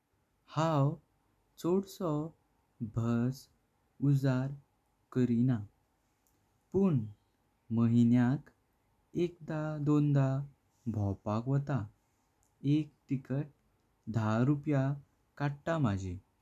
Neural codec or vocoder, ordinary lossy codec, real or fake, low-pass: none; none; real; 14.4 kHz